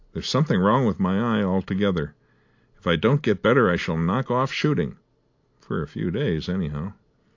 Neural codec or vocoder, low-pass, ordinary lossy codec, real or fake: none; 7.2 kHz; AAC, 48 kbps; real